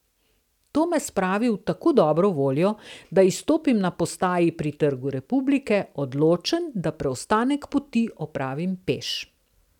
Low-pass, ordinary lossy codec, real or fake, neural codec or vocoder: 19.8 kHz; none; real; none